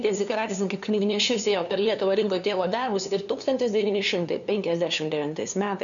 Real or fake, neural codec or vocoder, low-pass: fake; codec, 16 kHz, 2 kbps, FunCodec, trained on LibriTTS, 25 frames a second; 7.2 kHz